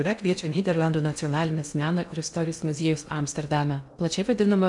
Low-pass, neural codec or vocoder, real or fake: 10.8 kHz; codec, 16 kHz in and 24 kHz out, 0.6 kbps, FocalCodec, streaming, 2048 codes; fake